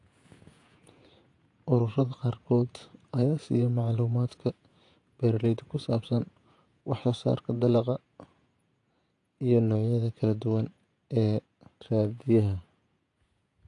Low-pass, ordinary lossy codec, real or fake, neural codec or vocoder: 10.8 kHz; AAC, 48 kbps; fake; vocoder, 48 kHz, 128 mel bands, Vocos